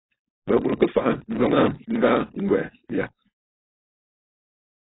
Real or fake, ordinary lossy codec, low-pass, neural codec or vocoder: fake; AAC, 16 kbps; 7.2 kHz; codec, 16 kHz, 4.8 kbps, FACodec